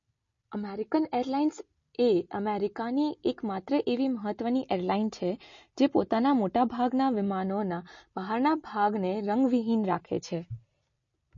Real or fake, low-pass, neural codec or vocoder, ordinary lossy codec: real; 7.2 kHz; none; MP3, 32 kbps